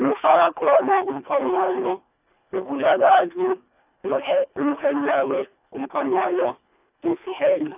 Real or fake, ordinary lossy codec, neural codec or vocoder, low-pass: fake; none; codec, 24 kHz, 1.5 kbps, HILCodec; 3.6 kHz